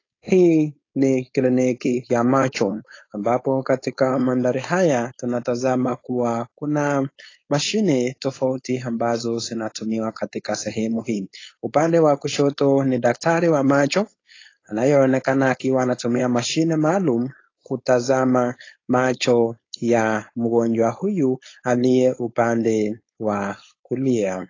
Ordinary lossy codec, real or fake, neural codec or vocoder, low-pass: AAC, 32 kbps; fake; codec, 16 kHz, 4.8 kbps, FACodec; 7.2 kHz